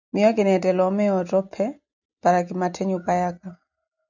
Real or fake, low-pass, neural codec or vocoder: real; 7.2 kHz; none